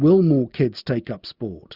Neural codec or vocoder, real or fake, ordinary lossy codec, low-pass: none; real; Opus, 64 kbps; 5.4 kHz